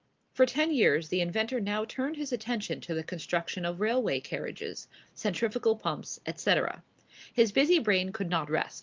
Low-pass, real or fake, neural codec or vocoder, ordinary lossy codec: 7.2 kHz; real; none; Opus, 24 kbps